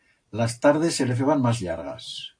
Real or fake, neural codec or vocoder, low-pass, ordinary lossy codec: real; none; 9.9 kHz; AAC, 48 kbps